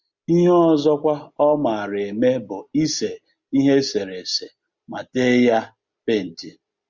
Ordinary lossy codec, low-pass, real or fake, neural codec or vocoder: Opus, 64 kbps; 7.2 kHz; real; none